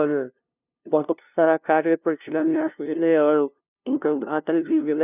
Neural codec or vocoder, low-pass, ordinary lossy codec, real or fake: codec, 16 kHz, 0.5 kbps, FunCodec, trained on LibriTTS, 25 frames a second; 3.6 kHz; none; fake